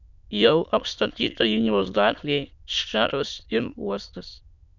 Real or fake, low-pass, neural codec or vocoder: fake; 7.2 kHz; autoencoder, 22.05 kHz, a latent of 192 numbers a frame, VITS, trained on many speakers